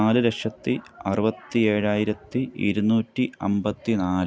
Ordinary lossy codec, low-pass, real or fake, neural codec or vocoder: none; none; real; none